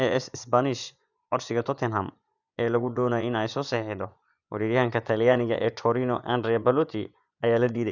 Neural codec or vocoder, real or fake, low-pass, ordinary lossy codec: vocoder, 24 kHz, 100 mel bands, Vocos; fake; 7.2 kHz; none